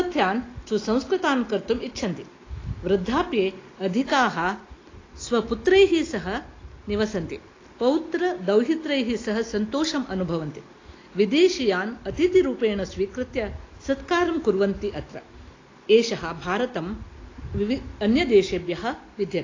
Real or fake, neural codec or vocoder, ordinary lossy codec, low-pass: real; none; AAC, 32 kbps; 7.2 kHz